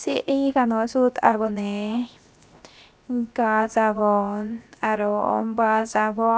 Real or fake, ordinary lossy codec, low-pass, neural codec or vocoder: fake; none; none; codec, 16 kHz, 0.7 kbps, FocalCodec